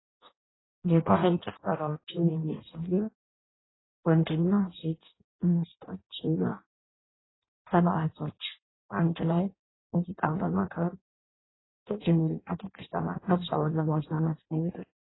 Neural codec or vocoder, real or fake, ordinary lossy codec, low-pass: codec, 16 kHz in and 24 kHz out, 0.6 kbps, FireRedTTS-2 codec; fake; AAC, 16 kbps; 7.2 kHz